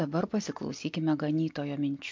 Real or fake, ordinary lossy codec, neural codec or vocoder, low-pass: real; MP3, 48 kbps; none; 7.2 kHz